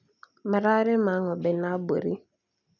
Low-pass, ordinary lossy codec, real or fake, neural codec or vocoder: 7.2 kHz; none; real; none